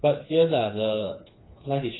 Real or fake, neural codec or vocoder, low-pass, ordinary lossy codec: fake; codec, 16 kHz, 4 kbps, FreqCodec, smaller model; 7.2 kHz; AAC, 16 kbps